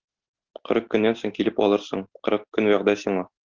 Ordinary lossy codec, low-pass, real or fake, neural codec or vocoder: Opus, 16 kbps; 7.2 kHz; real; none